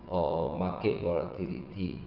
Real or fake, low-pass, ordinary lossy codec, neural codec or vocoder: fake; 5.4 kHz; none; vocoder, 22.05 kHz, 80 mel bands, WaveNeXt